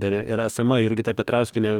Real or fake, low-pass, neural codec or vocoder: fake; 19.8 kHz; codec, 44.1 kHz, 2.6 kbps, DAC